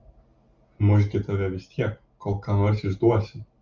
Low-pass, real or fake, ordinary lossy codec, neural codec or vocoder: 7.2 kHz; real; Opus, 32 kbps; none